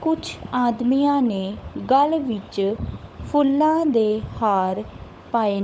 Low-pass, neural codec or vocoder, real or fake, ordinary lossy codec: none; codec, 16 kHz, 16 kbps, FunCodec, trained on LibriTTS, 50 frames a second; fake; none